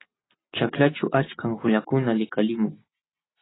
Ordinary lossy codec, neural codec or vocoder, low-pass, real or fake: AAC, 16 kbps; none; 7.2 kHz; real